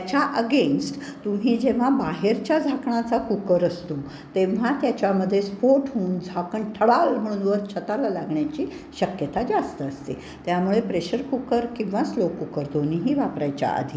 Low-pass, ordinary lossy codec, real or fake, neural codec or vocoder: none; none; real; none